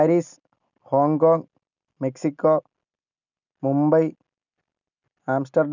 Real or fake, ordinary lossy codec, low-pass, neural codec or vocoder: real; none; 7.2 kHz; none